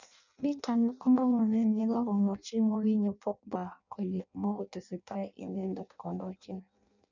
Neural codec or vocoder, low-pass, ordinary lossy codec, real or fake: codec, 16 kHz in and 24 kHz out, 0.6 kbps, FireRedTTS-2 codec; 7.2 kHz; none; fake